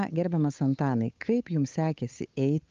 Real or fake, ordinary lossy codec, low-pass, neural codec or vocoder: fake; Opus, 24 kbps; 7.2 kHz; codec, 16 kHz, 8 kbps, FunCodec, trained on Chinese and English, 25 frames a second